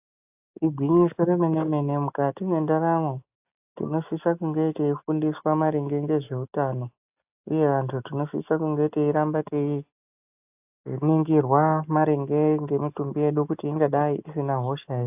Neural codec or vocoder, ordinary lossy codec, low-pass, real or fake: none; AAC, 32 kbps; 3.6 kHz; real